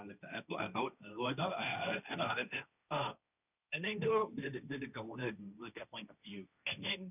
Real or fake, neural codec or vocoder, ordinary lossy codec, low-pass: fake; codec, 16 kHz, 1.1 kbps, Voila-Tokenizer; none; 3.6 kHz